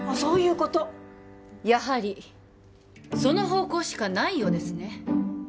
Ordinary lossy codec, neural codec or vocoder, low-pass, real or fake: none; none; none; real